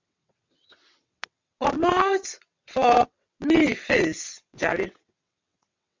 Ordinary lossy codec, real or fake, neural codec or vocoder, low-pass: MP3, 64 kbps; fake; vocoder, 22.05 kHz, 80 mel bands, WaveNeXt; 7.2 kHz